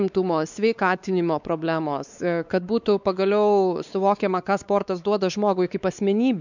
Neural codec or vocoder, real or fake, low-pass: codec, 16 kHz, 4 kbps, X-Codec, WavLM features, trained on Multilingual LibriSpeech; fake; 7.2 kHz